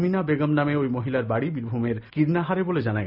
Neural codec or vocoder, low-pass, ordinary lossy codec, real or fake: none; 5.4 kHz; none; real